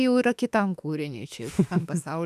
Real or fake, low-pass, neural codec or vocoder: fake; 14.4 kHz; autoencoder, 48 kHz, 32 numbers a frame, DAC-VAE, trained on Japanese speech